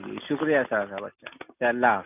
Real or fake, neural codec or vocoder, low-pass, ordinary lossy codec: real; none; 3.6 kHz; none